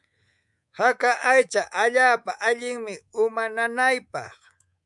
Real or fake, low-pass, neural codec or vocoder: fake; 10.8 kHz; codec, 24 kHz, 3.1 kbps, DualCodec